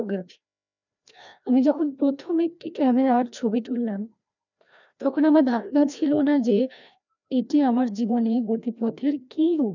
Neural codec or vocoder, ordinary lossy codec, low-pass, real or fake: codec, 16 kHz, 1 kbps, FreqCodec, larger model; none; 7.2 kHz; fake